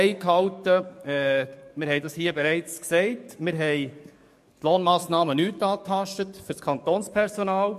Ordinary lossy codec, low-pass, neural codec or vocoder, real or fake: MP3, 64 kbps; 14.4 kHz; codec, 44.1 kHz, 7.8 kbps, DAC; fake